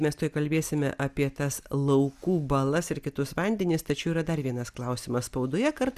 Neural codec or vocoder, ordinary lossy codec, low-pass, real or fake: none; Opus, 64 kbps; 14.4 kHz; real